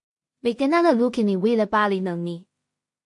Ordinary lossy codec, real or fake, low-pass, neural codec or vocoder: MP3, 48 kbps; fake; 10.8 kHz; codec, 16 kHz in and 24 kHz out, 0.4 kbps, LongCat-Audio-Codec, two codebook decoder